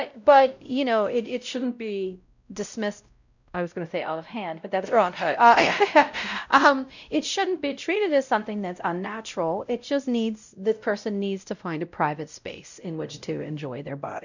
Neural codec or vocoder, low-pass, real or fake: codec, 16 kHz, 0.5 kbps, X-Codec, WavLM features, trained on Multilingual LibriSpeech; 7.2 kHz; fake